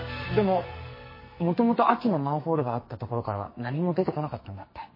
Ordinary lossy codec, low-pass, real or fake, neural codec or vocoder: MP3, 24 kbps; 5.4 kHz; fake; codec, 44.1 kHz, 2.6 kbps, SNAC